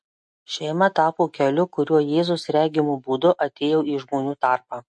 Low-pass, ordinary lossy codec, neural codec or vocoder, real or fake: 10.8 kHz; MP3, 48 kbps; none; real